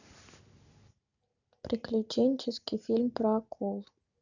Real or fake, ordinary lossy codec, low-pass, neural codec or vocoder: real; none; 7.2 kHz; none